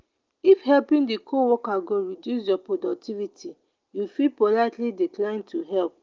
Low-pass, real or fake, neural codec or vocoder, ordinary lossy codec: 7.2 kHz; real; none; Opus, 24 kbps